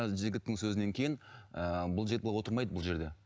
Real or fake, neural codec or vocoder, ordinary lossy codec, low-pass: real; none; none; none